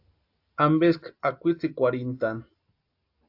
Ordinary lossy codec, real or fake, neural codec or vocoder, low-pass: AAC, 48 kbps; real; none; 5.4 kHz